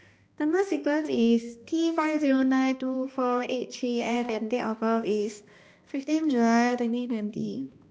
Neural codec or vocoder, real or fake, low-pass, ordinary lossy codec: codec, 16 kHz, 1 kbps, X-Codec, HuBERT features, trained on balanced general audio; fake; none; none